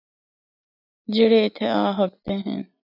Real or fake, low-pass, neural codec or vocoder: real; 5.4 kHz; none